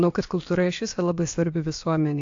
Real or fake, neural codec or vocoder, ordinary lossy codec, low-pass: fake; codec, 16 kHz, about 1 kbps, DyCAST, with the encoder's durations; AAC, 48 kbps; 7.2 kHz